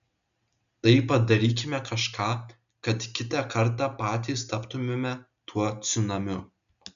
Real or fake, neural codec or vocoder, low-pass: real; none; 7.2 kHz